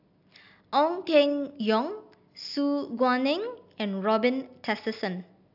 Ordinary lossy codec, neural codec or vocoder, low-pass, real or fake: AAC, 48 kbps; none; 5.4 kHz; real